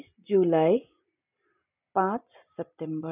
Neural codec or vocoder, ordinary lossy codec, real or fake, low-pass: none; none; real; 3.6 kHz